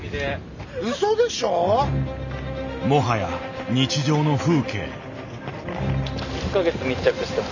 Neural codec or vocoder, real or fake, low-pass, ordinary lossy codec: none; real; 7.2 kHz; none